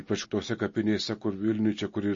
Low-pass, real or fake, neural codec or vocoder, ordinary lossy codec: 7.2 kHz; real; none; MP3, 32 kbps